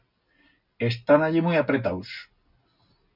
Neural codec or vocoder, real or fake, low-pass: none; real; 5.4 kHz